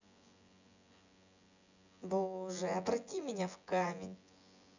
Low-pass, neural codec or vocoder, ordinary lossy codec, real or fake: 7.2 kHz; vocoder, 24 kHz, 100 mel bands, Vocos; none; fake